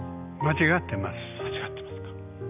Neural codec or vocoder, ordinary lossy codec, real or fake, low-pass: none; none; real; 3.6 kHz